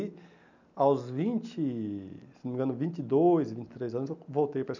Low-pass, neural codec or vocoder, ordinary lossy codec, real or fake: 7.2 kHz; none; none; real